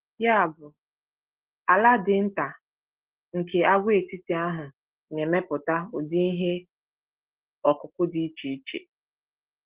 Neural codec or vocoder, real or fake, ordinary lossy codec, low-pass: none; real; Opus, 16 kbps; 3.6 kHz